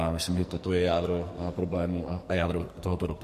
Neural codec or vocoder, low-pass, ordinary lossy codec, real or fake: codec, 44.1 kHz, 2.6 kbps, SNAC; 14.4 kHz; MP3, 64 kbps; fake